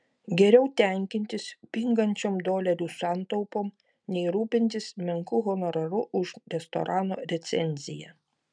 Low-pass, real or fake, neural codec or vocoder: 9.9 kHz; real; none